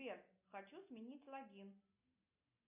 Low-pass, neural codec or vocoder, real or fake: 3.6 kHz; none; real